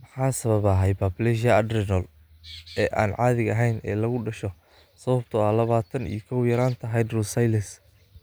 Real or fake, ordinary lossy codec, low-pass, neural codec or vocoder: real; none; none; none